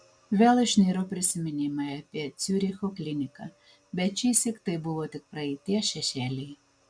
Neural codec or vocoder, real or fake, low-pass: none; real; 9.9 kHz